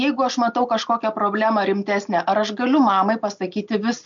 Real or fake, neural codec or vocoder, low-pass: real; none; 7.2 kHz